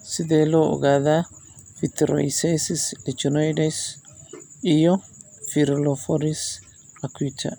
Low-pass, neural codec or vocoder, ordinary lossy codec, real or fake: none; none; none; real